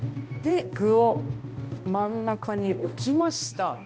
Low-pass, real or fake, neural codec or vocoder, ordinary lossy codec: none; fake; codec, 16 kHz, 1 kbps, X-Codec, HuBERT features, trained on balanced general audio; none